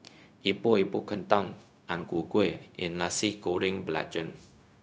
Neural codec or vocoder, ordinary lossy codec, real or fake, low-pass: codec, 16 kHz, 0.4 kbps, LongCat-Audio-Codec; none; fake; none